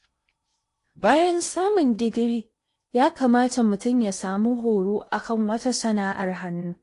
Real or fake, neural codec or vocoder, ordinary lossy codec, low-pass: fake; codec, 16 kHz in and 24 kHz out, 0.8 kbps, FocalCodec, streaming, 65536 codes; AAC, 48 kbps; 10.8 kHz